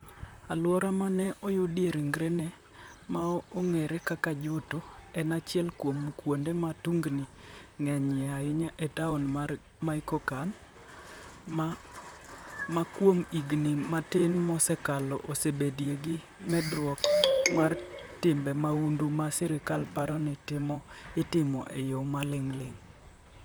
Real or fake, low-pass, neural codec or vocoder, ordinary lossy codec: fake; none; vocoder, 44.1 kHz, 128 mel bands, Pupu-Vocoder; none